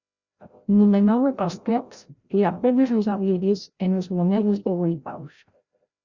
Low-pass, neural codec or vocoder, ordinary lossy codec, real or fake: 7.2 kHz; codec, 16 kHz, 0.5 kbps, FreqCodec, larger model; Opus, 64 kbps; fake